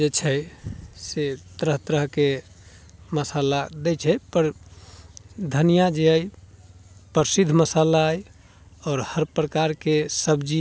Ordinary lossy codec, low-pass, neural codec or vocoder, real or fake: none; none; none; real